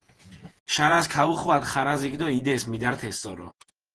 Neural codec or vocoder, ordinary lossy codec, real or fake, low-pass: vocoder, 48 kHz, 128 mel bands, Vocos; Opus, 16 kbps; fake; 10.8 kHz